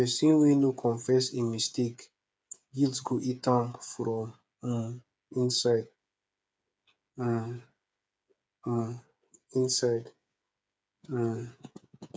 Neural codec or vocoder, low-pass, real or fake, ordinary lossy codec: codec, 16 kHz, 8 kbps, FreqCodec, smaller model; none; fake; none